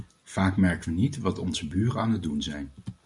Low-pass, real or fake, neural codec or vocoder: 10.8 kHz; real; none